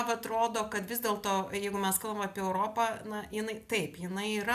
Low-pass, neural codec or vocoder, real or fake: 14.4 kHz; none; real